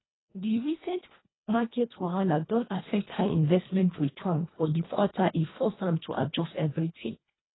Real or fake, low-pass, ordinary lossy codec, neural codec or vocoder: fake; 7.2 kHz; AAC, 16 kbps; codec, 24 kHz, 1.5 kbps, HILCodec